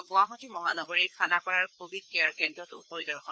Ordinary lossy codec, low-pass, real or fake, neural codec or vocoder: none; none; fake; codec, 16 kHz, 2 kbps, FreqCodec, larger model